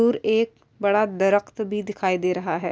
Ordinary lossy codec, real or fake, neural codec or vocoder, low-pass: none; real; none; none